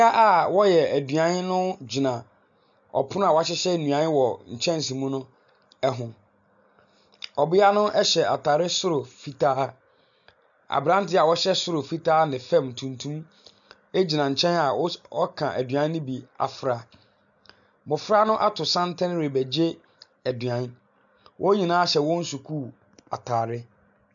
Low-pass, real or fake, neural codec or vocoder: 7.2 kHz; real; none